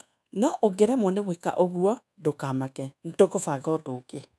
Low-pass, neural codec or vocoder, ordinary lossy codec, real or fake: none; codec, 24 kHz, 1.2 kbps, DualCodec; none; fake